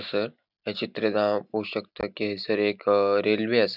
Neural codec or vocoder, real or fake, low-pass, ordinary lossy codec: none; real; 5.4 kHz; none